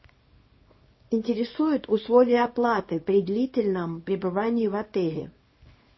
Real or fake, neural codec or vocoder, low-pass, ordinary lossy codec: fake; codec, 24 kHz, 0.9 kbps, WavTokenizer, small release; 7.2 kHz; MP3, 24 kbps